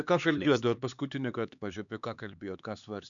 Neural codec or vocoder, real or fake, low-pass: codec, 16 kHz, 4 kbps, X-Codec, HuBERT features, trained on LibriSpeech; fake; 7.2 kHz